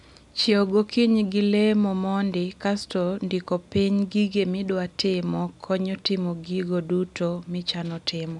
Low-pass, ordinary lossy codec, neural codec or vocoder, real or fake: 10.8 kHz; none; none; real